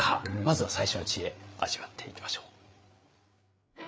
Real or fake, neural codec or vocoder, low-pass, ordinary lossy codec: fake; codec, 16 kHz, 8 kbps, FreqCodec, larger model; none; none